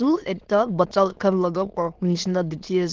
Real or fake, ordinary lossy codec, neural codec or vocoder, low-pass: fake; Opus, 16 kbps; autoencoder, 22.05 kHz, a latent of 192 numbers a frame, VITS, trained on many speakers; 7.2 kHz